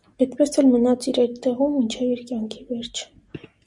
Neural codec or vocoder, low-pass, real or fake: none; 10.8 kHz; real